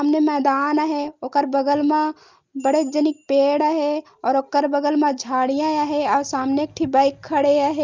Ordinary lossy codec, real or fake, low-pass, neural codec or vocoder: Opus, 24 kbps; real; 7.2 kHz; none